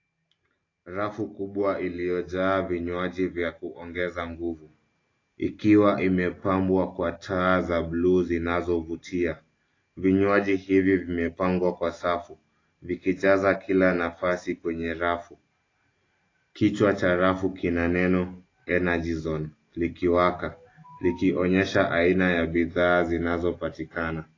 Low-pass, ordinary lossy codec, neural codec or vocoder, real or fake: 7.2 kHz; AAC, 32 kbps; none; real